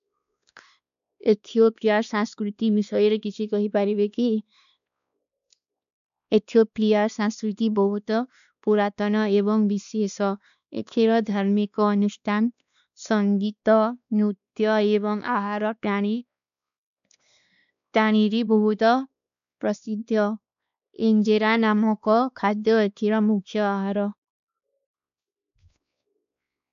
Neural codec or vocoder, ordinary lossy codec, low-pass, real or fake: codec, 16 kHz, 2 kbps, X-Codec, WavLM features, trained on Multilingual LibriSpeech; none; 7.2 kHz; fake